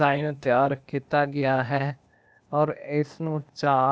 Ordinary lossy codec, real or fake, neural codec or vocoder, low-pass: none; fake; codec, 16 kHz, 0.8 kbps, ZipCodec; none